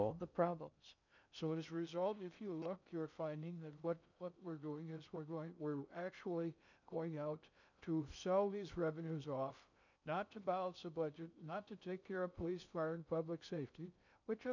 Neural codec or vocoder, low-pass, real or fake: codec, 16 kHz in and 24 kHz out, 0.6 kbps, FocalCodec, streaming, 2048 codes; 7.2 kHz; fake